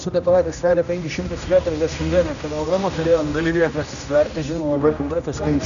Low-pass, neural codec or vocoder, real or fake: 7.2 kHz; codec, 16 kHz, 1 kbps, X-Codec, HuBERT features, trained on general audio; fake